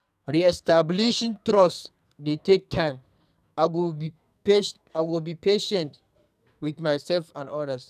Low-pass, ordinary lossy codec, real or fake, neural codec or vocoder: 14.4 kHz; none; fake; codec, 44.1 kHz, 2.6 kbps, SNAC